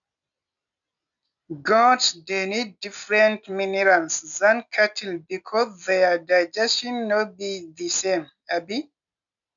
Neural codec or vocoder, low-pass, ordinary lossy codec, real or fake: none; 7.2 kHz; none; real